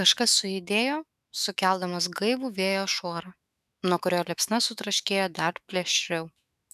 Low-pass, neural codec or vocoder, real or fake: 14.4 kHz; autoencoder, 48 kHz, 128 numbers a frame, DAC-VAE, trained on Japanese speech; fake